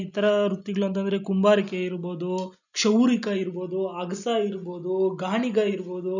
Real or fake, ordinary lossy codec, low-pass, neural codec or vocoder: real; none; 7.2 kHz; none